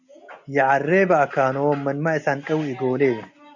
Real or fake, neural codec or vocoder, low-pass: real; none; 7.2 kHz